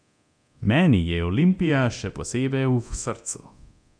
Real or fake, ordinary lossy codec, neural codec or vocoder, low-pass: fake; none; codec, 24 kHz, 0.9 kbps, DualCodec; 9.9 kHz